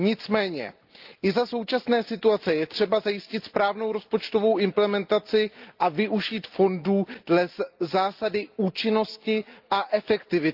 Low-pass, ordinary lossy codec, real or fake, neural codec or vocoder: 5.4 kHz; Opus, 24 kbps; real; none